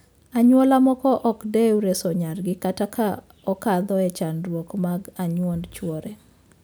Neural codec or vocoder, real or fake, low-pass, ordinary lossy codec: none; real; none; none